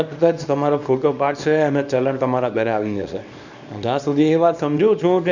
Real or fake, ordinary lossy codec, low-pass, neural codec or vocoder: fake; none; 7.2 kHz; codec, 24 kHz, 0.9 kbps, WavTokenizer, small release